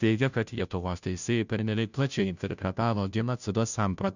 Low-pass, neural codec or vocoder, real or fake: 7.2 kHz; codec, 16 kHz, 0.5 kbps, FunCodec, trained on Chinese and English, 25 frames a second; fake